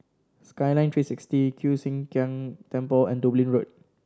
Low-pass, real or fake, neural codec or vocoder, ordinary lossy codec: none; real; none; none